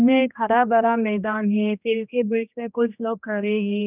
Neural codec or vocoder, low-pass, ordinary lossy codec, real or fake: codec, 16 kHz, 1 kbps, X-Codec, HuBERT features, trained on general audio; 3.6 kHz; none; fake